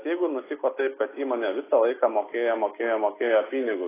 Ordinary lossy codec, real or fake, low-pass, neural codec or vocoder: AAC, 16 kbps; real; 3.6 kHz; none